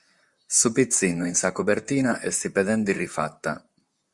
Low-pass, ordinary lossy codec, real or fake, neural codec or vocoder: 10.8 kHz; Opus, 64 kbps; fake; vocoder, 44.1 kHz, 128 mel bands, Pupu-Vocoder